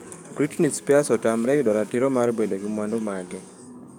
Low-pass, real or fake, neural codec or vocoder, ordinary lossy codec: 19.8 kHz; fake; codec, 44.1 kHz, 7.8 kbps, DAC; none